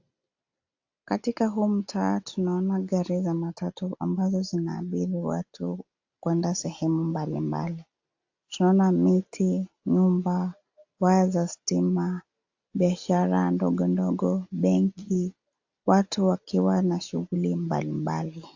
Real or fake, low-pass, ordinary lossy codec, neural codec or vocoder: real; 7.2 kHz; AAC, 48 kbps; none